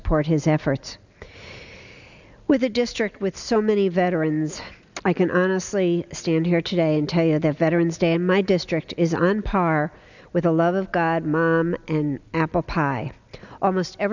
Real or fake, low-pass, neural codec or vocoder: real; 7.2 kHz; none